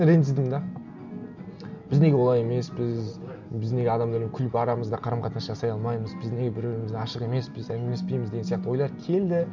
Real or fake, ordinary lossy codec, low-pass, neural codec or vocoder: real; none; 7.2 kHz; none